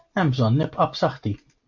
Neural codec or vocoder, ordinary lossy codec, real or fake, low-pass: none; MP3, 48 kbps; real; 7.2 kHz